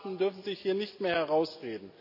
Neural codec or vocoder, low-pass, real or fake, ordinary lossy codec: none; 5.4 kHz; real; AAC, 48 kbps